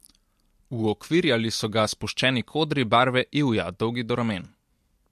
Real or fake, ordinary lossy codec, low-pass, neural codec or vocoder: real; MP3, 64 kbps; 14.4 kHz; none